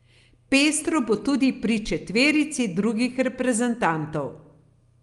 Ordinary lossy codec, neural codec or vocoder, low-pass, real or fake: Opus, 32 kbps; none; 10.8 kHz; real